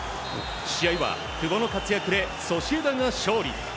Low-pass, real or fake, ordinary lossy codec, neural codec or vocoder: none; real; none; none